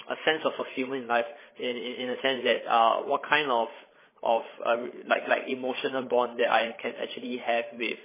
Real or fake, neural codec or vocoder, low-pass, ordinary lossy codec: fake; codec, 16 kHz, 8 kbps, FreqCodec, larger model; 3.6 kHz; MP3, 16 kbps